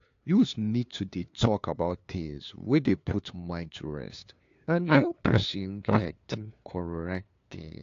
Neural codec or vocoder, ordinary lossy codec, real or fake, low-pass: codec, 16 kHz, 2 kbps, FunCodec, trained on LibriTTS, 25 frames a second; AAC, 48 kbps; fake; 7.2 kHz